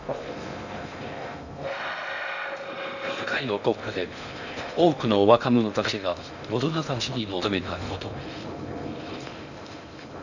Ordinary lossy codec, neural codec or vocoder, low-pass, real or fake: none; codec, 16 kHz in and 24 kHz out, 0.6 kbps, FocalCodec, streaming, 2048 codes; 7.2 kHz; fake